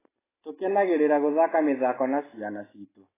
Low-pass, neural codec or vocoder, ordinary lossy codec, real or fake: 3.6 kHz; none; AAC, 16 kbps; real